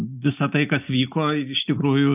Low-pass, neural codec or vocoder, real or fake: 3.6 kHz; none; real